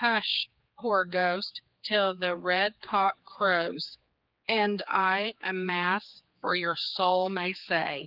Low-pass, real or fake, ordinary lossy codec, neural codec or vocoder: 5.4 kHz; fake; Opus, 32 kbps; codec, 16 kHz, 4 kbps, X-Codec, HuBERT features, trained on general audio